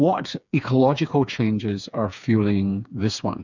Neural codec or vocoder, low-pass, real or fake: codec, 16 kHz, 4 kbps, FreqCodec, smaller model; 7.2 kHz; fake